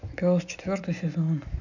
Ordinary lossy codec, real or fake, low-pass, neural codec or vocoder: none; real; 7.2 kHz; none